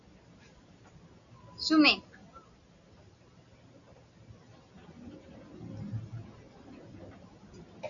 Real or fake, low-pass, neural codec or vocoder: real; 7.2 kHz; none